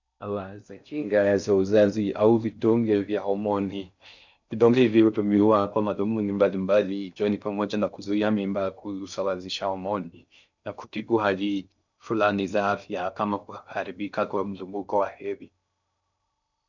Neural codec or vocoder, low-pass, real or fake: codec, 16 kHz in and 24 kHz out, 0.6 kbps, FocalCodec, streaming, 4096 codes; 7.2 kHz; fake